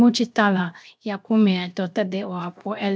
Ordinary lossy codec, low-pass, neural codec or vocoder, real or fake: none; none; codec, 16 kHz, 0.7 kbps, FocalCodec; fake